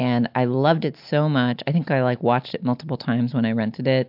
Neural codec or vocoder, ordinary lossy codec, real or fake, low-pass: none; MP3, 48 kbps; real; 5.4 kHz